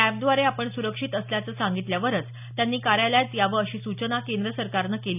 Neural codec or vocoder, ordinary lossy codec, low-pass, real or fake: none; none; 3.6 kHz; real